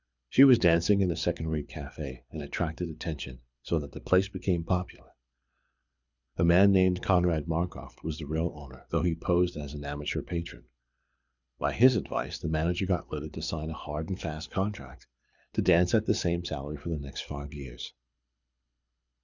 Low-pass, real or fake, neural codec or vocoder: 7.2 kHz; fake; codec, 24 kHz, 6 kbps, HILCodec